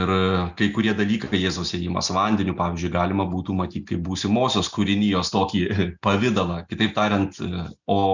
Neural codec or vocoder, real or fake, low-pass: none; real; 7.2 kHz